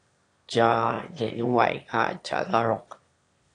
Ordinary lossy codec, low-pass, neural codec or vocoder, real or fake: AAC, 48 kbps; 9.9 kHz; autoencoder, 22.05 kHz, a latent of 192 numbers a frame, VITS, trained on one speaker; fake